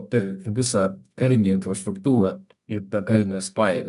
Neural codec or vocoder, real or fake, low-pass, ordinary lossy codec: codec, 24 kHz, 0.9 kbps, WavTokenizer, medium music audio release; fake; 10.8 kHz; MP3, 96 kbps